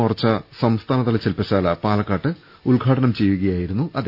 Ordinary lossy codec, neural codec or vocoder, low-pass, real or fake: none; none; 5.4 kHz; real